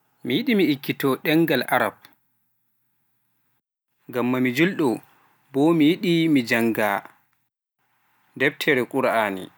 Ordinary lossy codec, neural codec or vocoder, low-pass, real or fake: none; none; none; real